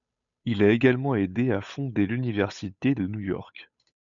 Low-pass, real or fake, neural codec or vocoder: 7.2 kHz; fake; codec, 16 kHz, 8 kbps, FunCodec, trained on Chinese and English, 25 frames a second